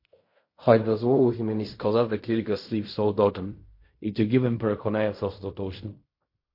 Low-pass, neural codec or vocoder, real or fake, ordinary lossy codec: 5.4 kHz; codec, 16 kHz in and 24 kHz out, 0.4 kbps, LongCat-Audio-Codec, fine tuned four codebook decoder; fake; MP3, 32 kbps